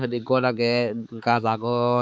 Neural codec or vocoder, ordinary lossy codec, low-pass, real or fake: codec, 16 kHz, 4 kbps, X-Codec, HuBERT features, trained on balanced general audio; none; none; fake